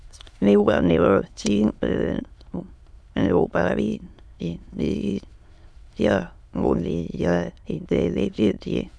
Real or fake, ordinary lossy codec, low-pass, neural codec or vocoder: fake; none; none; autoencoder, 22.05 kHz, a latent of 192 numbers a frame, VITS, trained on many speakers